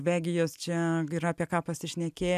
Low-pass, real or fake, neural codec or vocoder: 14.4 kHz; real; none